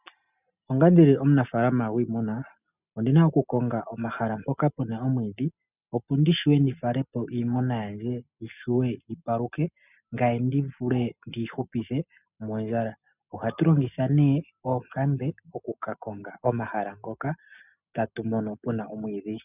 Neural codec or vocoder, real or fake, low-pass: none; real; 3.6 kHz